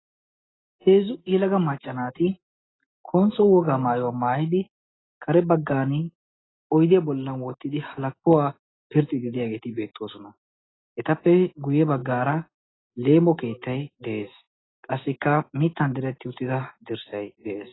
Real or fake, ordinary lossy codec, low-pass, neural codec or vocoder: real; AAC, 16 kbps; 7.2 kHz; none